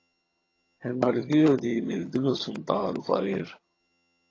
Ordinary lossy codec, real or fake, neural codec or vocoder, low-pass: AAC, 32 kbps; fake; vocoder, 22.05 kHz, 80 mel bands, HiFi-GAN; 7.2 kHz